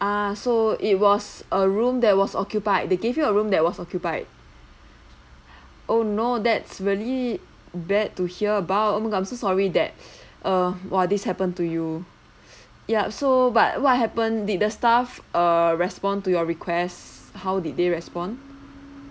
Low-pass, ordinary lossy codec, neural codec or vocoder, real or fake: none; none; none; real